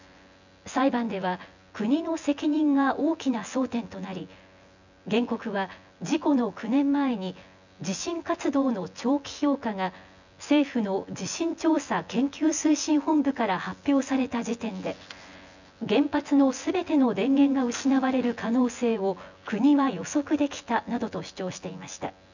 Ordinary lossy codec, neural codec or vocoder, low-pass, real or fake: none; vocoder, 24 kHz, 100 mel bands, Vocos; 7.2 kHz; fake